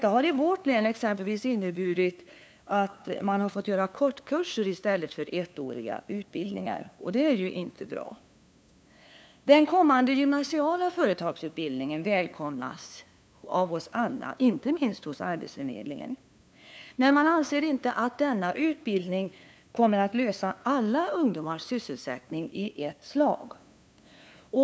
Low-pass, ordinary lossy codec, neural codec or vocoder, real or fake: none; none; codec, 16 kHz, 2 kbps, FunCodec, trained on LibriTTS, 25 frames a second; fake